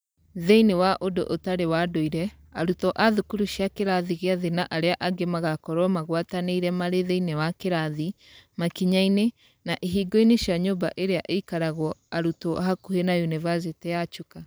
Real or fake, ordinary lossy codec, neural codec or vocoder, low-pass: fake; none; vocoder, 44.1 kHz, 128 mel bands every 512 samples, BigVGAN v2; none